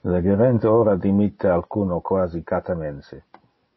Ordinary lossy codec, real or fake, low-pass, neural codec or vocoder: MP3, 24 kbps; real; 7.2 kHz; none